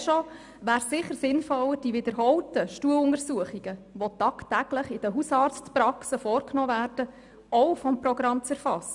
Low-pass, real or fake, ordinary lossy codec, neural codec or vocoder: 10.8 kHz; real; none; none